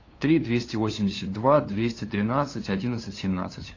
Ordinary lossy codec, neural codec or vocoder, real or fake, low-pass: AAC, 32 kbps; codec, 16 kHz, 2 kbps, FunCodec, trained on LibriTTS, 25 frames a second; fake; 7.2 kHz